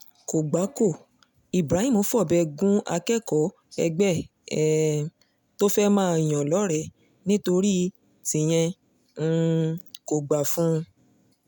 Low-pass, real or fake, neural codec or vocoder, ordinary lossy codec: none; real; none; none